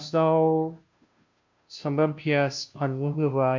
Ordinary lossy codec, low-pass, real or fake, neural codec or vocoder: MP3, 64 kbps; 7.2 kHz; fake; codec, 16 kHz, 0.5 kbps, FunCodec, trained on Chinese and English, 25 frames a second